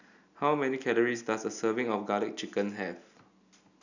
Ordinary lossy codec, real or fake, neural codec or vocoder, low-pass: none; real; none; 7.2 kHz